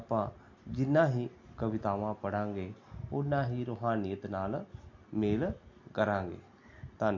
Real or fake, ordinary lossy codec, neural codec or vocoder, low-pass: real; MP3, 48 kbps; none; 7.2 kHz